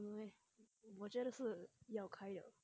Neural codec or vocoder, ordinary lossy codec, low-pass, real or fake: none; none; none; real